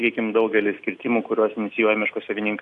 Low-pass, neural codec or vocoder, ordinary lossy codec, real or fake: 10.8 kHz; none; AAC, 64 kbps; real